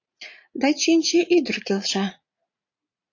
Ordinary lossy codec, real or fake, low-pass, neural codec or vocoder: AAC, 48 kbps; fake; 7.2 kHz; vocoder, 44.1 kHz, 80 mel bands, Vocos